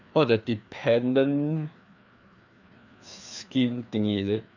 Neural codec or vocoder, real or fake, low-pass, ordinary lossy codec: codec, 16 kHz, 2 kbps, FreqCodec, larger model; fake; 7.2 kHz; none